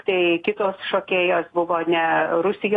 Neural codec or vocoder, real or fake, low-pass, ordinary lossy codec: none; real; 10.8 kHz; AAC, 32 kbps